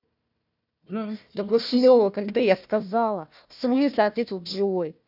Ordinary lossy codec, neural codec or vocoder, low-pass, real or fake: none; codec, 16 kHz, 1 kbps, FunCodec, trained on Chinese and English, 50 frames a second; 5.4 kHz; fake